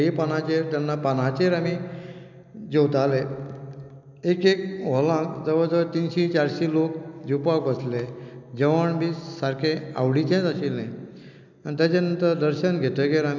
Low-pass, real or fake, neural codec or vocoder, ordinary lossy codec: 7.2 kHz; real; none; none